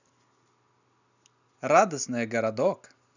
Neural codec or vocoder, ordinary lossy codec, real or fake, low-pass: none; none; real; 7.2 kHz